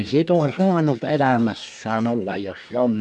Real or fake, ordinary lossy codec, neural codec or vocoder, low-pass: fake; none; codec, 24 kHz, 1 kbps, SNAC; 10.8 kHz